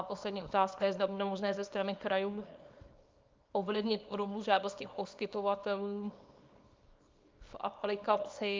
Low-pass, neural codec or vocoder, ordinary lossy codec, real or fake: 7.2 kHz; codec, 24 kHz, 0.9 kbps, WavTokenizer, small release; Opus, 24 kbps; fake